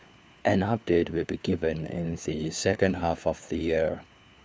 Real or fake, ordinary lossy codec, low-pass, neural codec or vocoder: fake; none; none; codec, 16 kHz, 4 kbps, FunCodec, trained on LibriTTS, 50 frames a second